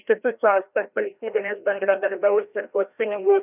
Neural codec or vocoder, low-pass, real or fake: codec, 16 kHz, 1 kbps, FreqCodec, larger model; 3.6 kHz; fake